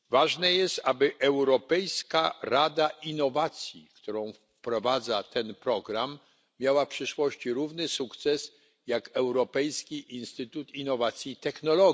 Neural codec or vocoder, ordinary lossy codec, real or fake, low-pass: none; none; real; none